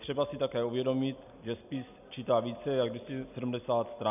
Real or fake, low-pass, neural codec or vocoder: real; 3.6 kHz; none